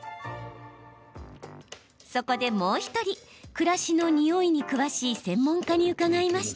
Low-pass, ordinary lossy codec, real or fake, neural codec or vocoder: none; none; real; none